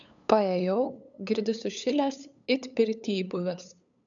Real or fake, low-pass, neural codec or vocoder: fake; 7.2 kHz; codec, 16 kHz, 8 kbps, FunCodec, trained on LibriTTS, 25 frames a second